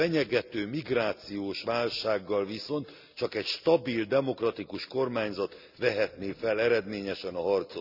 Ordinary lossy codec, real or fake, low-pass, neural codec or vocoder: none; real; 5.4 kHz; none